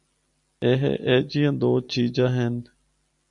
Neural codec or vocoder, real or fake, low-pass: none; real; 10.8 kHz